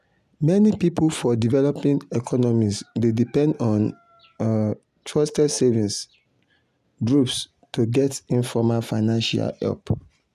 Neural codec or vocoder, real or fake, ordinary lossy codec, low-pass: none; real; none; 14.4 kHz